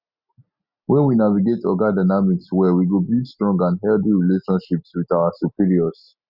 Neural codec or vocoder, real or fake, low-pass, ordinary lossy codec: none; real; 5.4 kHz; Opus, 64 kbps